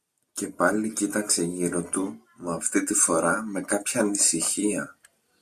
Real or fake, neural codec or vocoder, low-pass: real; none; 14.4 kHz